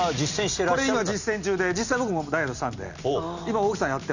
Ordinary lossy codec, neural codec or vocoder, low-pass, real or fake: none; none; 7.2 kHz; real